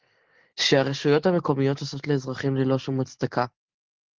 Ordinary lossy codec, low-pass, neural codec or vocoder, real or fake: Opus, 16 kbps; 7.2 kHz; none; real